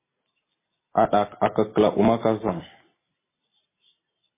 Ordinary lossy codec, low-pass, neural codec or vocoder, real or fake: MP3, 16 kbps; 3.6 kHz; vocoder, 44.1 kHz, 128 mel bands every 256 samples, BigVGAN v2; fake